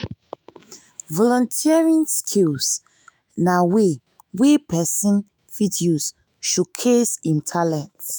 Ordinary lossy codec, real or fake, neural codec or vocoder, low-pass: none; fake; autoencoder, 48 kHz, 128 numbers a frame, DAC-VAE, trained on Japanese speech; none